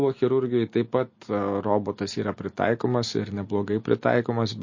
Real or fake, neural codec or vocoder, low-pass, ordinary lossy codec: real; none; 7.2 kHz; MP3, 32 kbps